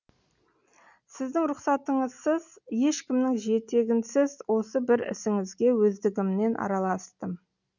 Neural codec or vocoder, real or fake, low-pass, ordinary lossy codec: none; real; 7.2 kHz; none